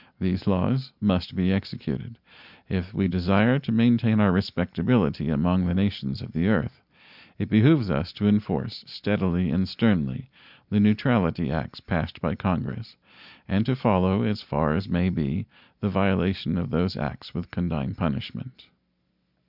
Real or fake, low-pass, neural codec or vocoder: real; 5.4 kHz; none